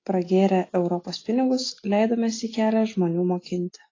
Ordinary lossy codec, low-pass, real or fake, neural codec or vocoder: AAC, 32 kbps; 7.2 kHz; real; none